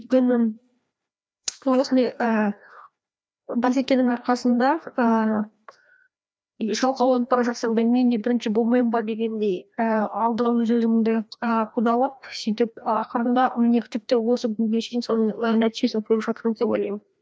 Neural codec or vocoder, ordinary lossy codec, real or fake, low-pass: codec, 16 kHz, 1 kbps, FreqCodec, larger model; none; fake; none